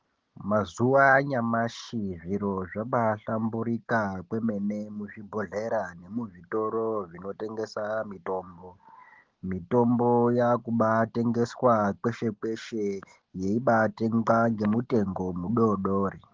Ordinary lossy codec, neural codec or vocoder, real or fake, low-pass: Opus, 16 kbps; none; real; 7.2 kHz